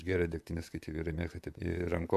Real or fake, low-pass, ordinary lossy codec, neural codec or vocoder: fake; 14.4 kHz; AAC, 96 kbps; vocoder, 44.1 kHz, 128 mel bands, Pupu-Vocoder